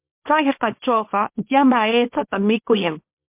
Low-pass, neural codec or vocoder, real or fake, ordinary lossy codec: 3.6 kHz; codec, 24 kHz, 0.9 kbps, WavTokenizer, small release; fake; MP3, 32 kbps